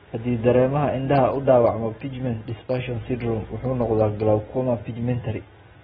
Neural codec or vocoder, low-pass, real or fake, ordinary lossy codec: none; 7.2 kHz; real; AAC, 16 kbps